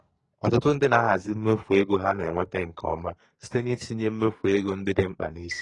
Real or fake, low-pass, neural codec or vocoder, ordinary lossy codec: fake; 10.8 kHz; codec, 44.1 kHz, 2.6 kbps, SNAC; AAC, 32 kbps